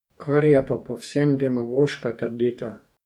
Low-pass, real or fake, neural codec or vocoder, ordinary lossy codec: 19.8 kHz; fake; codec, 44.1 kHz, 2.6 kbps, DAC; none